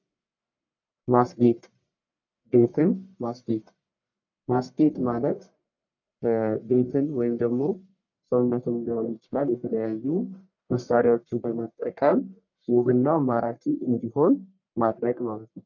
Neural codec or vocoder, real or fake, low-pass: codec, 44.1 kHz, 1.7 kbps, Pupu-Codec; fake; 7.2 kHz